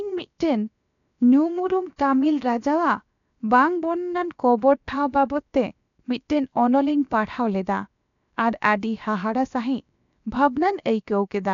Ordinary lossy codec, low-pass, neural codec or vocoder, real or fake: none; 7.2 kHz; codec, 16 kHz, about 1 kbps, DyCAST, with the encoder's durations; fake